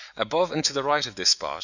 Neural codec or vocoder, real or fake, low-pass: autoencoder, 48 kHz, 128 numbers a frame, DAC-VAE, trained on Japanese speech; fake; 7.2 kHz